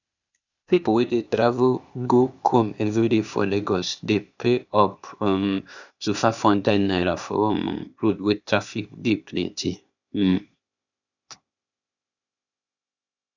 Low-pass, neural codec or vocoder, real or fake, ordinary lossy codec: 7.2 kHz; codec, 16 kHz, 0.8 kbps, ZipCodec; fake; none